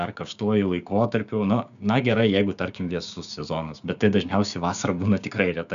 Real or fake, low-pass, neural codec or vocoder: fake; 7.2 kHz; codec, 16 kHz, 6 kbps, DAC